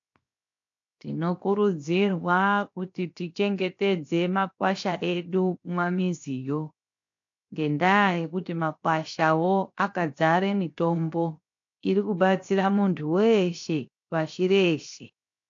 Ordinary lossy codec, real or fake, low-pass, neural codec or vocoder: AAC, 48 kbps; fake; 7.2 kHz; codec, 16 kHz, 0.7 kbps, FocalCodec